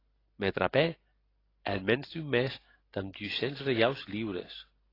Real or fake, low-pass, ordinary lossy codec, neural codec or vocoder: real; 5.4 kHz; AAC, 24 kbps; none